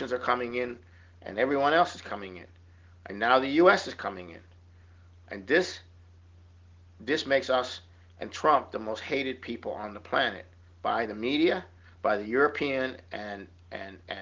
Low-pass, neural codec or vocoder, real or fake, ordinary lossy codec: 7.2 kHz; none; real; Opus, 16 kbps